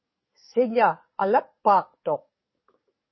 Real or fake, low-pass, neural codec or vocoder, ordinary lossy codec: fake; 7.2 kHz; codec, 44.1 kHz, 7.8 kbps, DAC; MP3, 24 kbps